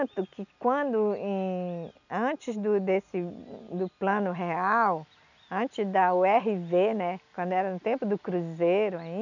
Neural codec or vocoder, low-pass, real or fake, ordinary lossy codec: none; 7.2 kHz; real; none